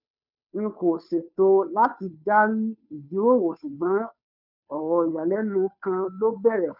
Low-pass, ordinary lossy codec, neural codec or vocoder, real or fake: 5.4 kHz; none; codec, 16 kHz, 2 kbps, FunCodec, trained on Chinese and English, 25 frames a second; fake